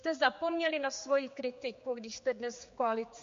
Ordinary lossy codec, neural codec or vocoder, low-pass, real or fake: MP3, 48 kbps; codec, 16 kHz, 4 kbps, X-Codec, HuBERT features, trained on general audio; 7.2 kHz; fake